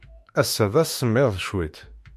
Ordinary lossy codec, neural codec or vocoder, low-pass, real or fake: MP3, 64 kbps; autoencoder, 48 kHz, 32 numbers a frame, DAC-VAE, trained on Japanese speech; 14.4 kHz; fake